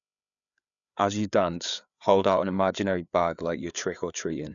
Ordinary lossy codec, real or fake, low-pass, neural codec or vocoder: none; fake; 7.2 kHz; codec, 16 kHz, 4 kbps, FreqCodec, larger model